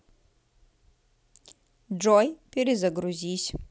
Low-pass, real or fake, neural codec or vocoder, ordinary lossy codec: none; real; none; none